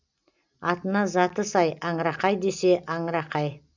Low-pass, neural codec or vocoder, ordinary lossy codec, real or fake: 7.2 kHz; none; none; real